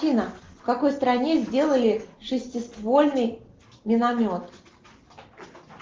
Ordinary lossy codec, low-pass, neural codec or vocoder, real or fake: Opus, 16 kbps; 7.2 kHz; none; real